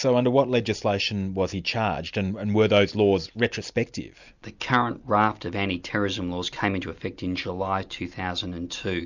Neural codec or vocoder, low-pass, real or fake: none; 7.2 kHz; real